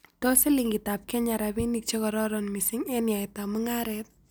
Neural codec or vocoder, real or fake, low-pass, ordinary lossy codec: none; real; none; none